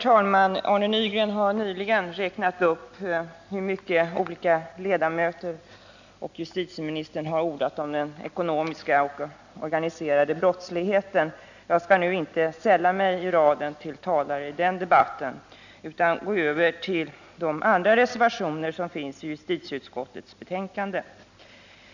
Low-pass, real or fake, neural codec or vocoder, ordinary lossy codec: 7.2 kHz; real; none; none